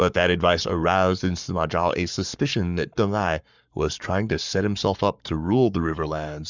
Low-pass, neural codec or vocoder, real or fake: 7.2 kHz; codec, 44.1 kHz, 7.8 kbps, Pupu-Codec; fake